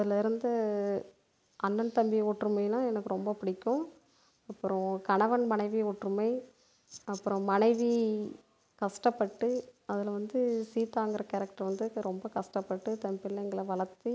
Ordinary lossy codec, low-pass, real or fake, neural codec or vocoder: none; none; real; none